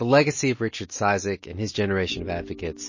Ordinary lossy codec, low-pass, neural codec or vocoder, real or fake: MP3, 32 kbps; 7.2 kHz; none; real